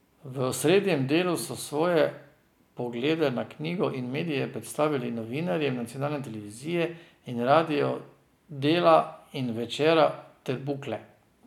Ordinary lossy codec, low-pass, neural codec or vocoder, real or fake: none; 19.8 kHz; none; real